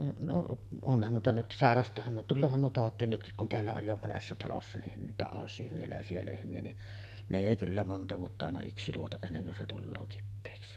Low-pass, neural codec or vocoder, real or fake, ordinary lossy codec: 14.4 kHz; codec, 44.1 kHz, 2.6 kbps, SNAC; fake; none